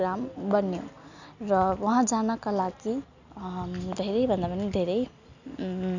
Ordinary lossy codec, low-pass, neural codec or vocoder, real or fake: none; 7.2 kHz; none; real